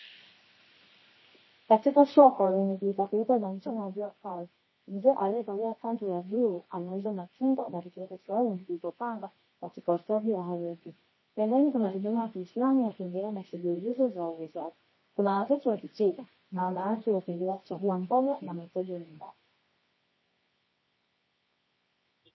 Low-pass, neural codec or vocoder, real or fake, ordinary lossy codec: 7.2 kHz; codec, 24 kHz, 0.9 kbps, WavTokenizer, medium music audio release; fake; MP3, 24 kbps